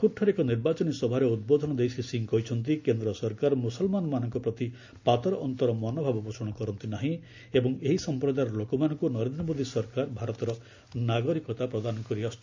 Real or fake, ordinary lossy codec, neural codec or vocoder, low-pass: real; MP3, 64 kbps; none; 7.2 kHz